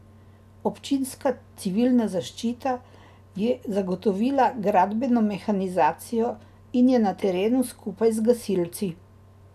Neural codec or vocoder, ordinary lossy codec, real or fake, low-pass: none; none; real; 14.4 kHz